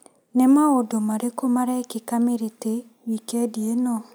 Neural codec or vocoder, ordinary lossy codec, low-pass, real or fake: none; none; none; real